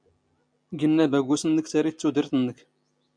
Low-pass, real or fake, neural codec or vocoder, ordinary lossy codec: 9.9 kHz; real; none; MP3, 96 kbps